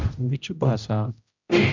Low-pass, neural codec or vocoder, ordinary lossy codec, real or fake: 7.2 kHz; codec, 16 kHz, 0.5 kbps, X-Codec, HuBERT features, trained on general audio; Opus, 64 kbps; fake